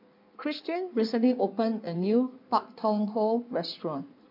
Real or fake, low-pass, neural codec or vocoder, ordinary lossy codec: fake; 5.4 kHz; codec, 16 kHz in and 24 kHz out, 1.1 kbps, FireRedTTS-2 codec; MP3, 48 kbps